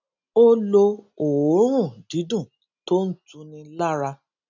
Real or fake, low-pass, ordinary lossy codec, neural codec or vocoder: real; 7.2 kHz; none; none